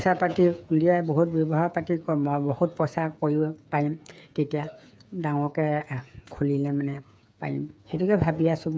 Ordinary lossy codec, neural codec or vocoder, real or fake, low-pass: none; codec, 16 kHz, 8 kbps, FreqCodec, smaller model; fake; none